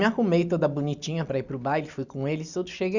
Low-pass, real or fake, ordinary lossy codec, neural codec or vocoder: 7.2 kHz; real; Opus, 64 kbps; none